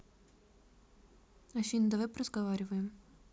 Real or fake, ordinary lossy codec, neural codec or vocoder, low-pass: real; none; none; none